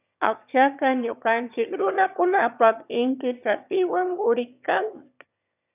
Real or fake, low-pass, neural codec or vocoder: fake; 3.6 kHz; autoencoder, 22.05 kHz, a latent of 192 numbers a frame, VITS, trained on one speaker